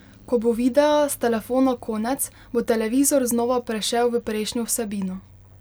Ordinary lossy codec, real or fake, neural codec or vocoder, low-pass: none; real; none; none